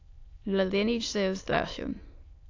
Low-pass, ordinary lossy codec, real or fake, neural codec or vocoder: 7.2 kHz; AAC, 48 kbps; fake; autoencoder, 22.05 kHz, a latent of 192 numbers a frame, VITS, trained on many speakers